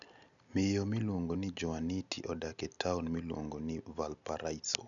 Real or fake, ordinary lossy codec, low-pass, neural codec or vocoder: real; none; 7.2 kHz; none